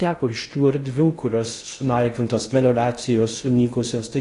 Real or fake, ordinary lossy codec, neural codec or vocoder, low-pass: fake; AAC, 48 kbps; codec, 16 kHz in and 24 kHz out, 0.6 kbps, FocalCodec, streaming, 2048 codes; 10.8 kHz